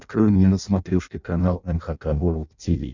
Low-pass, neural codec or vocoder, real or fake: 7.2 kHz; codec, 16 kHz in and 24 kHz out, 0.6 kbps, FireRedTTS-2 codec; fake